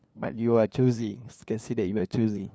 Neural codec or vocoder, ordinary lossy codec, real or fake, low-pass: codec, 16 kHz, 2 kbps, FunCodec, trained on LibriTTS, 25 frames a second; none; fake; none